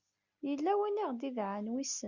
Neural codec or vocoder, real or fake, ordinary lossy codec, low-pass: none; real; Opus, 64 kbps; 7.2 kHz